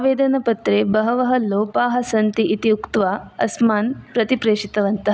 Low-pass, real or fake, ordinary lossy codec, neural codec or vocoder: none; real; none; none